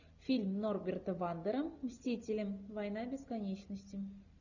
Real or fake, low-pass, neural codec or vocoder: real; 7.2 kHz; none